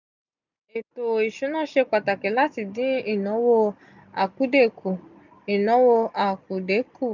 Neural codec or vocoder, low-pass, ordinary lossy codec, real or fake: none; 7.2 kHz; none; real